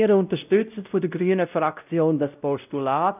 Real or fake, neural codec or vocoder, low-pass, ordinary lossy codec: fake; codec, 16 kHz, 0.5 kbps, X-Codec, WavLM features, trained on Multilingual LibriSpeech; 3.6 kHz; none